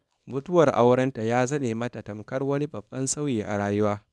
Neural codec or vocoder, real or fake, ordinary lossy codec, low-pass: codec, 24 kHz, 0.9 kbps, WavTokenizer, small release; fake; none; none